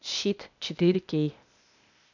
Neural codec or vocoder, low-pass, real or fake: codec, 16 kHz, 0.8 kbps, ZipCodec; 7.2 kHz; fake